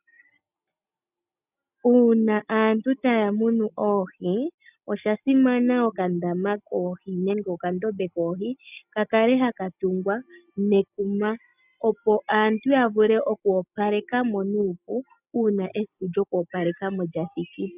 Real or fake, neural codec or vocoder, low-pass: real; none; 3.6 kHz